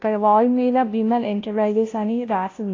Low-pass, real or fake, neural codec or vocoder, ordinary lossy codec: 7.2 kHz; fake; codec, 16 kHz, 0.5 kbps, FunCodec, trained on Chinese and English, 25 frames a second; AAC, 32 kbps